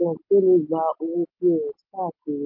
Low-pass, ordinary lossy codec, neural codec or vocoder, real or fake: 5.4 kHz; MP3, 32 kbps; none; real